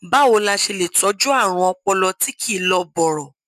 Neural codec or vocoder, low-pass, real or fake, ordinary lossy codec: none; 14.4 kHz; real; none